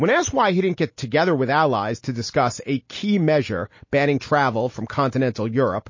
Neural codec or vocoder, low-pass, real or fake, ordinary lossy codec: none; 7.2 kHz; real; MP3, 32 kbps